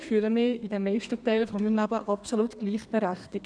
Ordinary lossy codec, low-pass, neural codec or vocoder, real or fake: none; 9.9 kHz; codec, 32 kHz, 1.9 kbps, SNAC; fake